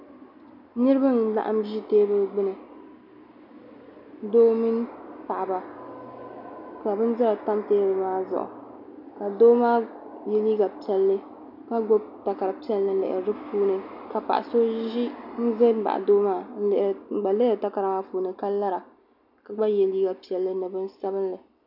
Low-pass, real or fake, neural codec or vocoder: 5.4 kHz; real; none